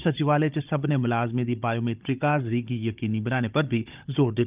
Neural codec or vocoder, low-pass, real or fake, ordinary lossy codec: codec, 16 kHz, 16 kbps, FunCodec, trained on Chinese and English, 50 frames a second; 3.6 kHz; fake; Opus, 24 kbps